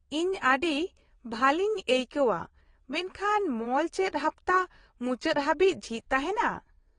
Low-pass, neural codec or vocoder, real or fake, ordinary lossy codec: 9.9 kHz; vocoder, 22.05 kHz, 80 mel bands, WaveNeXt; fake; AAC, 32 kbps